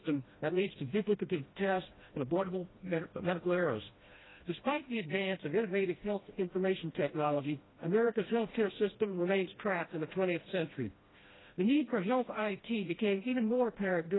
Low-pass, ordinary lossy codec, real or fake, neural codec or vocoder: 7.2 kHz; AAC, 16 kbps; fake; codec, 16 kHz, 1 kbps, FreqCodec, smaller model